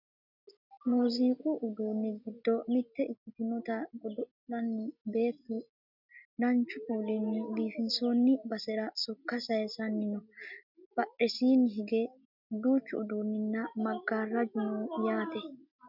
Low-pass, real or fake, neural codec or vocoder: 5.4 kHz; real; none